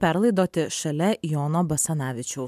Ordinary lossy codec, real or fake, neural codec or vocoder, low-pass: MP3, 96 kbps; real; none; 14.4 kHz